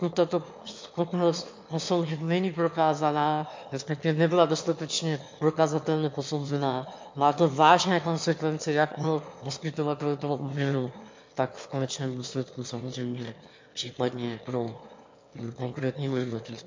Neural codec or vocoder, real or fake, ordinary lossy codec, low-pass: autoencoder, 22.05 kHz, a latent of 192 numbers a frame, VITS, trained on one speaker; fake; MP3, 48 kbps; 7.2 kHz